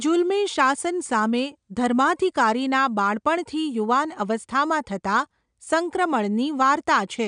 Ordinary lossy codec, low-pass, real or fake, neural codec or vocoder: none; 9.9 kHz; real; none